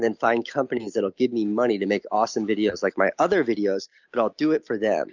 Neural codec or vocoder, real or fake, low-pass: none; real; 7.2 kHz